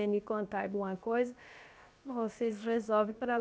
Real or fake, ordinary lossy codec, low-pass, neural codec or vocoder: fake; none; none; codec, 16 kHz, about 1 kbps, DyCAST, with the encoder's durations